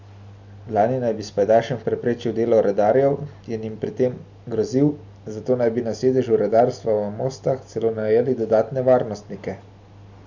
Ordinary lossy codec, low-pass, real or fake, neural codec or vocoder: none; 7.2 kHz; real; none